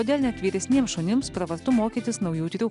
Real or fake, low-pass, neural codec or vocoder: real; 10.8 kHz; none